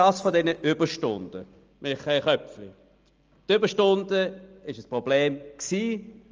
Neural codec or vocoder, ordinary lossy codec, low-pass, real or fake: none; Opus, 24 kbps; 7.2 kHz; real